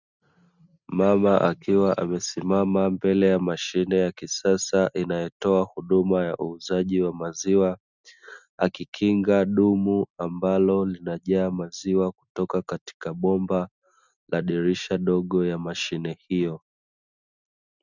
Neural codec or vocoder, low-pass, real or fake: none; 7.2 kHz; real